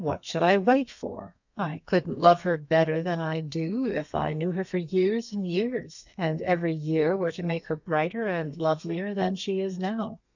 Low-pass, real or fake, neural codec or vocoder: 7.2 kHz; fake; codec, 32 kHz, 1.9 kbps, SNAC